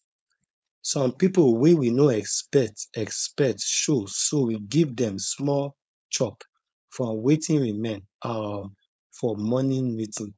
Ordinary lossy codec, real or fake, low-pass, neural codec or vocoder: none; fake; none; codec, 16 kHz, 4.8 kbps, FACodec